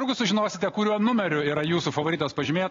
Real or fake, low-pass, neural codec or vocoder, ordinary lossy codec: real; 7.2 kHz; none; AAC, 32 kbps